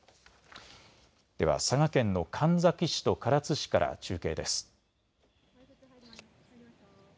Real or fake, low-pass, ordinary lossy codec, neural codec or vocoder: real; none; none; none